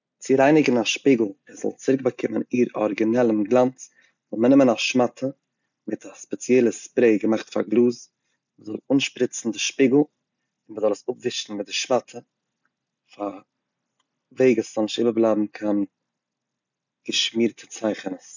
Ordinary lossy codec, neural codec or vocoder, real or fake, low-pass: none; none; real; 7.2 kHz